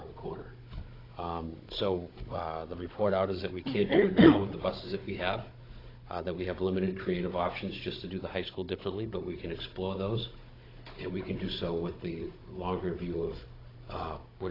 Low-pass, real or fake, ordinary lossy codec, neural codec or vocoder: 5.4 kHz; fake; AAC, 24 kbps; codec, 16 kHz, 16 kbps, FunCodec, trained on Chinese and English, 50 frames a second